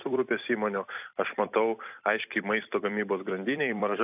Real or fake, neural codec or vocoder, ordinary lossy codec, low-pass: fake; vocoder, 44.1 kHz, 128 mel bands every 256 samples, BigVGAN v2; AAC, 32 kbps; 3.6 kHz